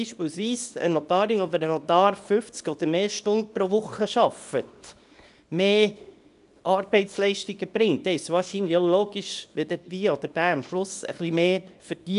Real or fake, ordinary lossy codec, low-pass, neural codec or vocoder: fake; none; 10.8 kHz; codec, 24 kHz, 0.9 kbps, WavTokenizer, small release